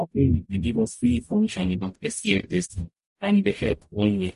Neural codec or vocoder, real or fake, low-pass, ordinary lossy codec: codec, 44.1 kHz, 0.9 kbps, DAC; fake; 14.4 kHz; MP3, 48 kbps